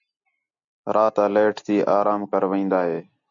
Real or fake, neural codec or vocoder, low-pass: real; none; 7.2 kHz